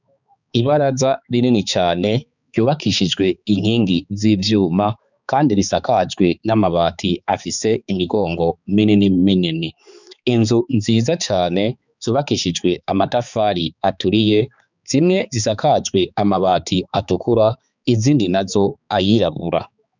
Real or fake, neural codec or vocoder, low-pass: fake; codec, 16 kHz, 4 kbps, X-Codec, HuBERT features, trained on balanced general audio; 7.2 kHz